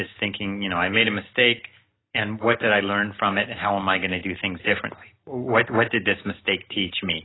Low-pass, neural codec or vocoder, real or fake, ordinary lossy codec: 7.2 kHz; none; real; AAC, 16 kbps